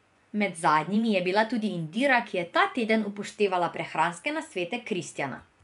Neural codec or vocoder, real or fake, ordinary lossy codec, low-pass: vocoder, 24 kHz, 100 mel bands, Vocos; fake; none; 10.8 kHz